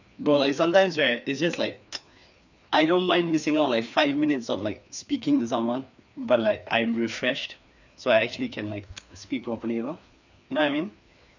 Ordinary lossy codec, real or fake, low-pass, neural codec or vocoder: none; fake; 7.2 kHz; codec, 16 kHz, 2 kbps, FreqCodec, larger model